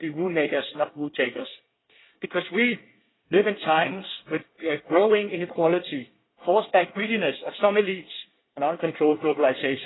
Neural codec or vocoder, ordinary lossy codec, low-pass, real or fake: codec, 24 kHz, 1 kbps, SNAC; AAC, 16 kbps; 7.2 kHz; fake